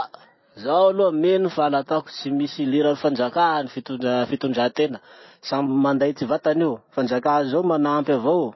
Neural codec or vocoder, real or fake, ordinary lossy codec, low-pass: none; real; MP3, 24 kbps; 7.2 kHz